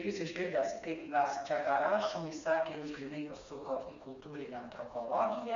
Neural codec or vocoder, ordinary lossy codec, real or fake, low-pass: codec, 16 kHz, 2 kbps, FreqCodec, smaller model; MP3, 64 kbps; fake; 7.2 kHz